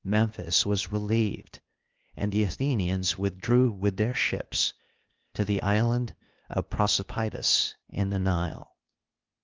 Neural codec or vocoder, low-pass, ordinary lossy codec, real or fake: codec, 16 kHz, 0.8 kbps, ZipCodec; 7.2 kHz; Opus, 32 kbps; fake